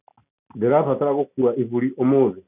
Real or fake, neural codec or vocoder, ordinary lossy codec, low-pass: real; none; none; 3.6 kHz